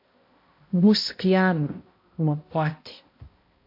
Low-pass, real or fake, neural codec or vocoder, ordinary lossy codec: 5.4 kHz; fake; codec, 16 kHz, 0.5 kbps, X-Codec, HuBERT features, trained on balanced general audio; MP3, 32 kbps